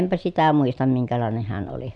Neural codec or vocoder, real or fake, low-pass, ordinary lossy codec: none; real; none; none